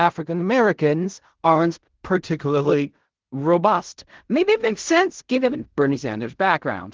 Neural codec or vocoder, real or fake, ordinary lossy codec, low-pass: codec, 16 kHz in and 24 kHz out, 0.4 kbps, LongCat-Audio-Codec, fine tuned four codebook decoder; fake; Opus, 16 kbps; 7.2 kHz